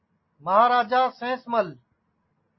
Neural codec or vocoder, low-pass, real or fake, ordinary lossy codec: none; 7.2 kHz; real; MP3, 24 kbps